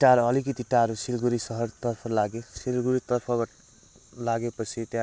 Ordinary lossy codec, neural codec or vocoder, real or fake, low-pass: none; none; real; none